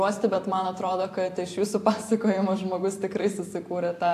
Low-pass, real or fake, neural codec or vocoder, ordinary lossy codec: 14.4 kHz; fake; vocoder, 44.1 kHz, 128 mel bands every 512 samples, BigVGAN v2; AAC, 64 kbps